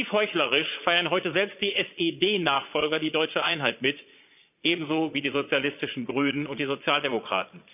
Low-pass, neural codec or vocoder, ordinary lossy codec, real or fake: 3.6 kHz; vocoder, 22.05 kHz, 80 mel bands, Vocos; none; fake